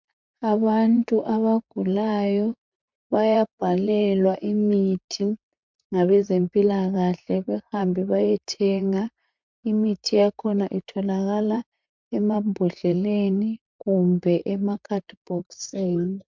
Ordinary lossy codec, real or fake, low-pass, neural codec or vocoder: MP3, 64 kbps; fake; 7.2 kHz; vocoder, 44.1 kHz, 128 mel bands, Pupu-Vocoder